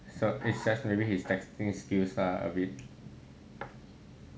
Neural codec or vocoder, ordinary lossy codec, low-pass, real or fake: none; none; none; real